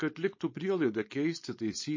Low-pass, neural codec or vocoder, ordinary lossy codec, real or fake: 7.2 kHz; codec, 16 kHz, 4.8 kbps, FACodec; MP3, 32 kbps; fake